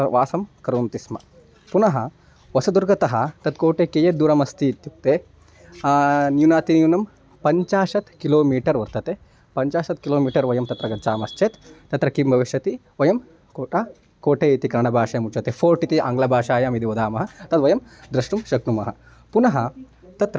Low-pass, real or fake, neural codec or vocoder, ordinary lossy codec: none; real; none; none